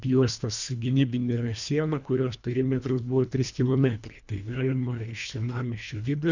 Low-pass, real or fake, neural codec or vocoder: 7.2 kHz; fake; codec, 24 kHz, 1.5 kbps, HILCodec